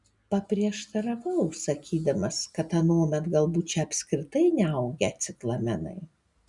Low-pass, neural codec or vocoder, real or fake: 10.8 kHz; none; real